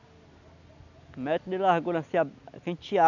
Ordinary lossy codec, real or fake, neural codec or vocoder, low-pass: none; real; none; 7.2 kHz